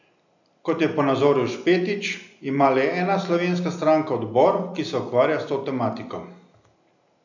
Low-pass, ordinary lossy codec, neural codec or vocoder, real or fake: 7.2 kHz; none; none; real